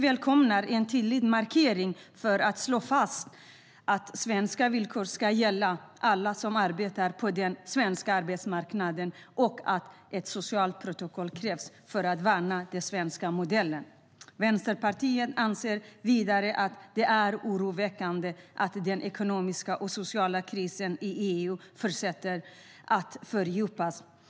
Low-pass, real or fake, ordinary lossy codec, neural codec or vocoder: none; real; none; none